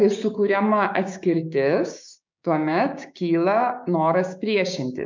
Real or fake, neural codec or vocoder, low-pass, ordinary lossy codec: fake; codec, 16 kHz, 6 kbps, DAC; 7.2 kHz; MP3, 64 kbps